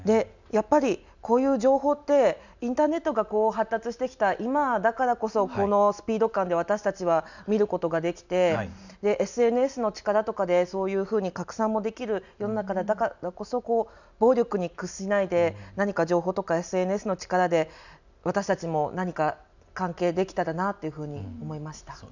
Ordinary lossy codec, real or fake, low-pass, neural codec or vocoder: none; real; 7.2 kHz; none